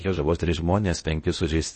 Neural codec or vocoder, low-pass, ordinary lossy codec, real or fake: codec, 16 kHz in and 24 kHz out, 0.6 kbps, FocalCodec, streaming, 4096 codes; 10.8 kHz; MP3, 32 kbps; fake